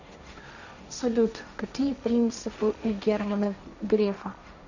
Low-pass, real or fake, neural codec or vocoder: 7.2 kHz; fake; codec, 16 kHz, 1.1 kbps, Voila-Tokenizer